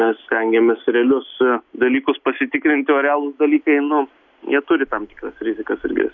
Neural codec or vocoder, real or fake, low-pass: none; real; 7.2 kHz